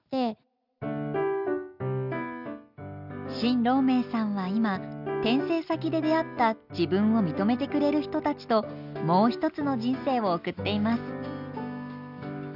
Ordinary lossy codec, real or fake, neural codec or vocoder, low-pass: none; real; none; 5.4 kHz